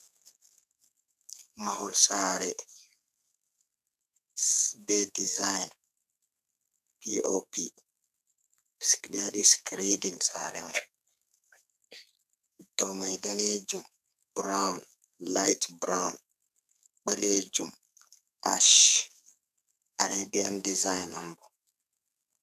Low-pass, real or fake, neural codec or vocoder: 14.4 kHz; fake; codec, 32 kHz, 1.9 kbps, SNAC